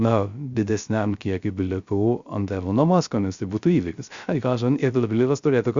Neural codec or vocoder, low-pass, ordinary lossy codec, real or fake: codec, 16 kHz, 0.3 kbps, FocalCodec; 7.2 kHz; Opus, 64 kbps; fake